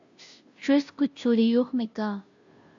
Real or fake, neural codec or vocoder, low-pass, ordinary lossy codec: fake; codec, 16 kHz, 0.5 kbps, FunCodec, trained on Chinese and English, 25 frames a second; 7.2 kHz; AAC, 64 kbps